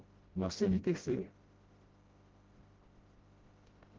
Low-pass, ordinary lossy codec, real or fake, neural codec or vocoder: 7.2 kHz; Opus, 16 kbps; fake; codec, 16 kHz, 0.5 kbps, FreqCodec, smaller model